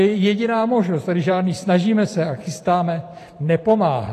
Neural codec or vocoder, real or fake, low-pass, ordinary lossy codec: vocoder, 44.1 kHz, 128 mel bands every 512 samples, BigVGAN v2; fake; 14.4 kHz; AAC, 48 kbps